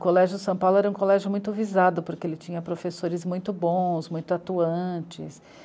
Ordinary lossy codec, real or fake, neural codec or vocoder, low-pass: none; real; none; none